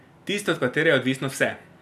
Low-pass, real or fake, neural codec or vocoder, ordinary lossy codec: 14.4 kHz; real; none; none